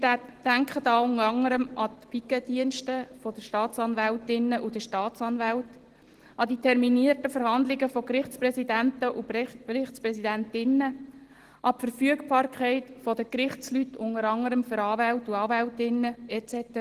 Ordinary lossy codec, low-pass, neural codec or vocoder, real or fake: Opus, 16 kbps; 14.4 kHz; none; real